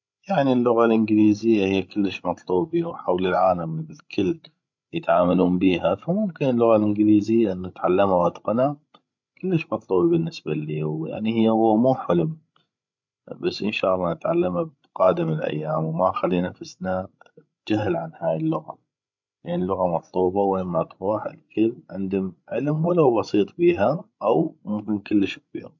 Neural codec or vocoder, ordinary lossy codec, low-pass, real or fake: codec, 16 kHz, 16 kbps, FreqCodec, larger model; MP3, 64 kbps; 7.2 kHz; fake